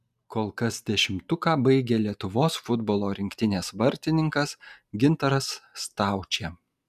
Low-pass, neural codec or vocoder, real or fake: 14.4 kHz; none; real